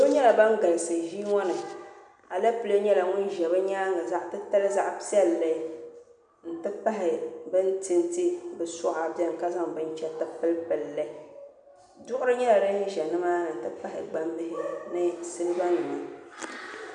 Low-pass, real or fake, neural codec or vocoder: 10.8 kHz; real; none